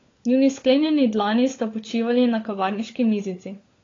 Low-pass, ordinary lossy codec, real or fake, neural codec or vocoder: 7.2 kHz; AAC, 32 kbps; fake; codec, 16 kHz, 16 kbps, FunCodec, trained on LibriTTS, 50 frames a second